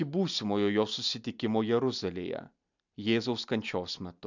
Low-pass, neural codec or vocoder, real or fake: 7.2 kHz; none; real